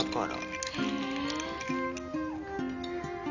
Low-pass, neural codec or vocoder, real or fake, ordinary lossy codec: 7.2 kHz; none; real; none